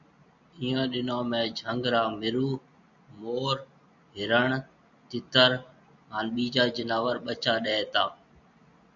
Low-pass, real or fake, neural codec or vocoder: 7.2 kHz; real; none